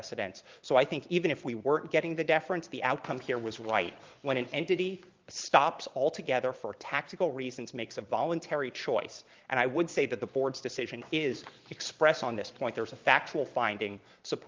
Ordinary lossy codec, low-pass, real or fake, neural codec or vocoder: Opus, 24 kbps; 7.2 kHz; fake; vocoder, 22.05 kHz, 80 mel bands, Vocos